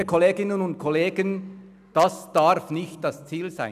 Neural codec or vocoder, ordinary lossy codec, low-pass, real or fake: none; none; 14.4 kHz; real